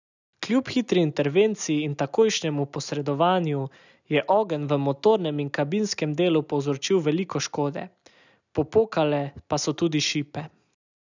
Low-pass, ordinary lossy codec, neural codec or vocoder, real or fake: 7.2 kHz; none; none; real